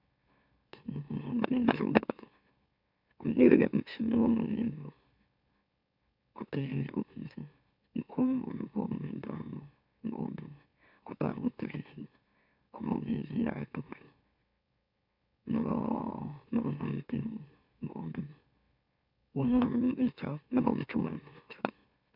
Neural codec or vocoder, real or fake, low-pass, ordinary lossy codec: autoencoder, 44.1 kHz, a latent of 192 numbers a frame, MeloTTS; fake; 5.4 kHz; none